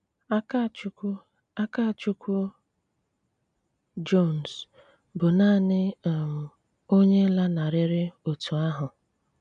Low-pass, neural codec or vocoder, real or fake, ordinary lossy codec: 9.9 kHz; none; real; none